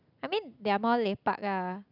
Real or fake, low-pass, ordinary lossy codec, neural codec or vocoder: real; 5.4 kHz; none; none